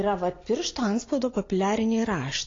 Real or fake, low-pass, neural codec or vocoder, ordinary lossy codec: real; 7.2 kHz; none; AAC, 32 kbps